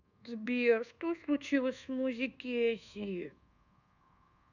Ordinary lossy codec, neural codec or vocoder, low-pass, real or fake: none; codec, 24 kHz, 1.2 kbps, DualCodec; 7.2 kHz; fake